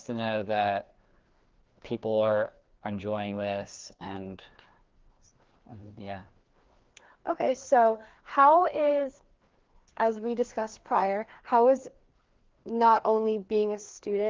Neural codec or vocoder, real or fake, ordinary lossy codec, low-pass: codec, 16 kHz, 2 kbps, FreqCodec, larger model; fake; Opus, 16 kbps; 7.2 kHz